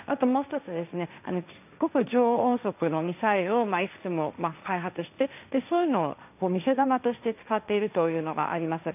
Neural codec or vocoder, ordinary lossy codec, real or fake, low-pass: codec, 16 kHz, 1.1 kbps, Voila-Tokenizer; none; fake; 3.6 kHz